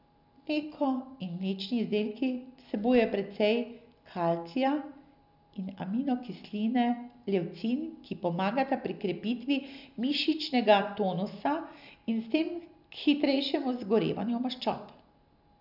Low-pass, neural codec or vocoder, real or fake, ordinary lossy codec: 5.4 kHz; none; real; none